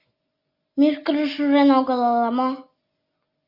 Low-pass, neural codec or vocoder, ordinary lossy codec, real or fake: 5.4 kHz; none; Opus, 64 kbps; real